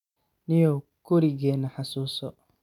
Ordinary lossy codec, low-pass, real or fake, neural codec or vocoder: none; 19.8 kHz; real; none